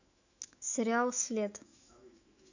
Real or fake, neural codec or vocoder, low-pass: fake; autoencoder, 48 kHz, 128 numbers a frame, DAC-VAE, trained on Japanese speech; 7.2 kHz